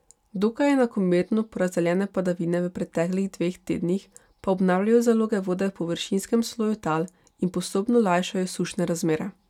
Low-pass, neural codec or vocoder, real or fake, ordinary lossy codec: 19.8 kHz; none; real; none